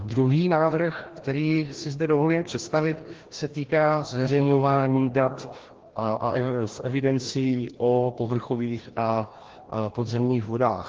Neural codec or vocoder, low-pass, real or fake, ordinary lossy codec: codec, 16 kHz, 1 kbps, FreqCodec, larger model; 7.2 kHz; fake; Opus, 16 kbps